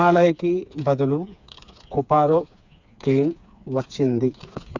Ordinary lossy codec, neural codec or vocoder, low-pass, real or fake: Opus, 64 kbps; codec, 16 kHz, 4 kbps, FreqCodec, smaller model; 7.2 kHz; fake